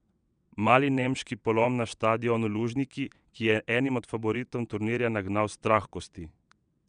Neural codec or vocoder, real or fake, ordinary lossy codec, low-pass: vocoder, 22.05 kHz, 80 mel bands, WaveNeXt; fake; none; 9.9 kHz